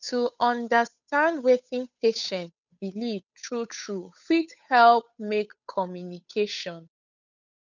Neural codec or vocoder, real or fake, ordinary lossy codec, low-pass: codec, 16 kHz, 8 kbps, FunCodec, trained on Chinese and English, 25 frames a second; fake; none; 7.2 kHz